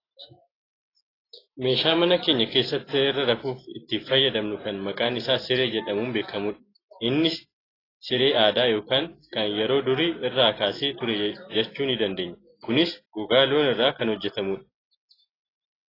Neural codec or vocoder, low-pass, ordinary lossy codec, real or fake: none; 5.4 kHz; AAC, 24 kbps; real